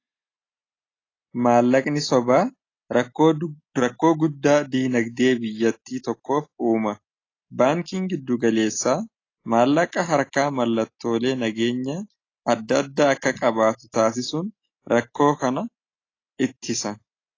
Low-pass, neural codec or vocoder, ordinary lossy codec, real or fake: 7.2 kHz; none; AAC, 32 kbps; real